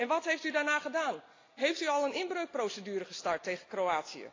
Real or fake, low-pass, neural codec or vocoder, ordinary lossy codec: real; 7.2 kHz; none; AAC, 32 kbps